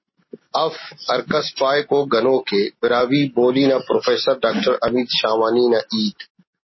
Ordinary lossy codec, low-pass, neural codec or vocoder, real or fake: MP3, 24 kbps; 7.2 kHz; none; real